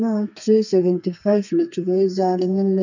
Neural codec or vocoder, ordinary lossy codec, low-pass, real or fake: codec, 32 kHz, 1.9 kbps, SNAC; none; 7.2 kHz; fake